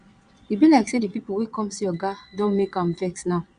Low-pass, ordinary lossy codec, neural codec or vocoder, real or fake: 9.9 kHz; Opus, 64 kbps; vocoder, 22.05 kHz, 80 mel bands, Vocos; fake